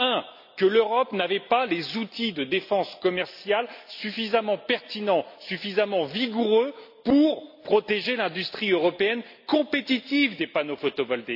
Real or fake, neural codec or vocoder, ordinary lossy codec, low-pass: real; none; AAC, 48 kbps; 5.4 kHz